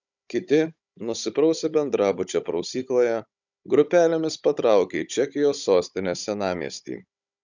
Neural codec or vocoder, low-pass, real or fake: codec, 16 kHz, 16 kbps, FunCodec, trained on Chinese and English, 50 frames a second; 7.2 kHz; fake